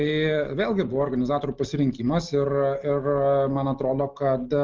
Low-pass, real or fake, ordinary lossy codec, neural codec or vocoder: 7.2 kHz; real; Opus, 32 kbps; none